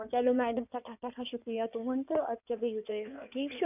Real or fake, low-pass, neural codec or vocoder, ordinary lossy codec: fake; 3.6 kHz; codec, 16 kHz in and 24 kHz out, 2.2 kbps, FireRedTTS-2 codec; none